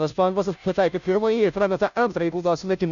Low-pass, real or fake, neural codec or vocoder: 7.2 kHz; fake; codec, 16 kHz, 0.5 kbps, FunCodec, trained on Chinese and English, 25 frames a second